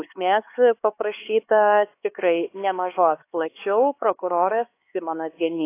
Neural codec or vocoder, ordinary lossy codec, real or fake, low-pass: codec, 16 kHz, 4 kbps, X-Codec, HuBERT features, trained on LibriSpeech; AAC, 24 kbps; fake; 3.6 kHz